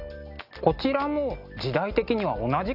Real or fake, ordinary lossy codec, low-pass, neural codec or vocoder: real; Opus, 64 kbps; 5.4 kHz; none